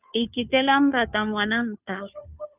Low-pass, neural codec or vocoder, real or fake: 3.6 kHz; codec, 16 kHz, 2 kbps, FunCodec, trained on Chinese and English, 25 frames a second; fake